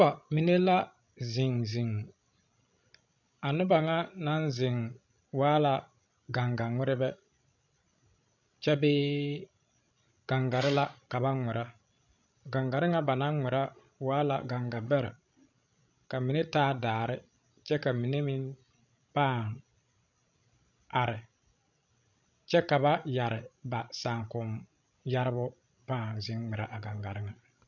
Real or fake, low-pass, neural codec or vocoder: fake; 7.2 kHz; codec, 16 kHz, 16 kbps, FreqCodec, larger model